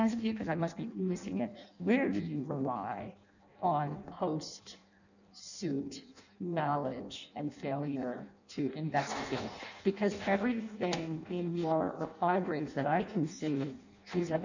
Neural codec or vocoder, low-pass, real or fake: codec, 16 kHz in and 24 kHz out, 0.6 kbps, FireRedTTS-2 codec; 7.2 kHz; fake